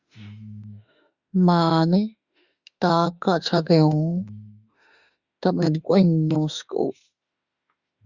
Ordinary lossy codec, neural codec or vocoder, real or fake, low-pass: Opus, 64 kbps; autoencoder, 48 kHz, 32 numbers a frame, DAC-VAE, trained on Japanese speech; fake; 7.2 kHz